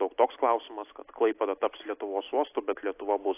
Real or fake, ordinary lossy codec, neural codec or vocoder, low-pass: real; AAC, 24 kbps; none; 3.6 kHz